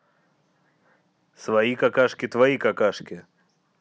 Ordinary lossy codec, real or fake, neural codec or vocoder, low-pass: none; real; none; none